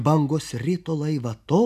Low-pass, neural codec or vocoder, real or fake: 14.4 kHz; none; real